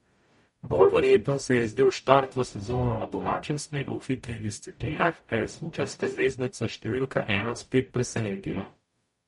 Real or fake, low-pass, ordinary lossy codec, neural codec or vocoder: fake; 19.8 kHz; MP3, 48 kbps; codec, 44.1 kHz, 0.9 kbps, DAC